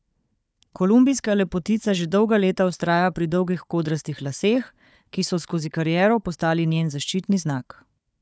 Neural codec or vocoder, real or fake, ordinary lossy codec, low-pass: codec, 16 kHz, 4 kbps, FunCodec, trained on Chinese and English, 50 frames a second; fake; none; none